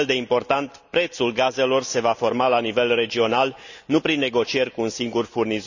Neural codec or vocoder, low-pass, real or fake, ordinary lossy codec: none; 7.2 kHz; real; none